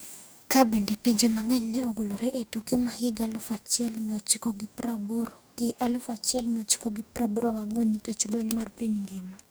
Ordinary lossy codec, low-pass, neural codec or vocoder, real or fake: none; none; codec, 44.1 kHz, 2.6 kbps, DAC; fake